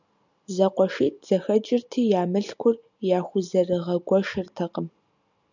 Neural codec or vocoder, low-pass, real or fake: none; 7.2 kHz; real